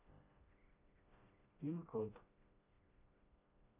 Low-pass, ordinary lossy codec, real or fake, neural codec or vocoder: 3.6 kHz; MP3, 32 kbps; fake; codec, 16 kHz, 1 kbps, FreqCodec, smaller model